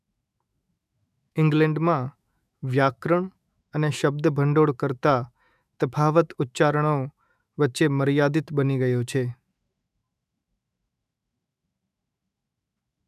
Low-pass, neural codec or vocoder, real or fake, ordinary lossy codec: 14.4 kHz; autoencoder, 48 kHz, 128 numbers a frame, DAC-VAE, trained on Japanese speech; fake; none